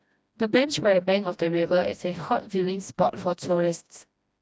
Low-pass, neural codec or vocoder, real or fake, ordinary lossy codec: none; codec, 16 kHz, 1 kbps, FreqCodec, smaller model; fake; none